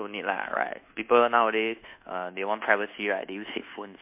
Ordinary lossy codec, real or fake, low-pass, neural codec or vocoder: MP3, 32 kbps; fake; 3.6 kHz; codec, 24 kHz, 1.2 kbps, DualCodec